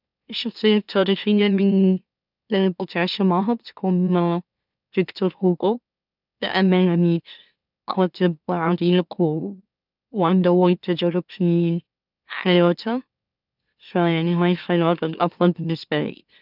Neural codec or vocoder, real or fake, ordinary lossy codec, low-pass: autoencoder, 44.1 kHz, a latent of 192 numbers a frame, MeloTTS; fake; none; 5.4 kHz